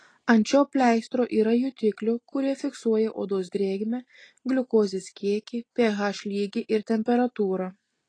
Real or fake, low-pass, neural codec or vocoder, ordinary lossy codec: real; 9.9 kHz; none; AAC, 32 kbps